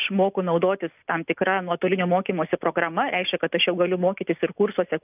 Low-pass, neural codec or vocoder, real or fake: 3.6 kHz; none; real